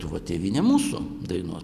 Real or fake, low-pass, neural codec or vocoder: real; 14.4 kHz; none